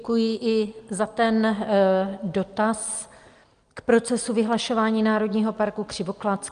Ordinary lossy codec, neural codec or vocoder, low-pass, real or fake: Opus, 32 kbps; none; 9.9 kHz; real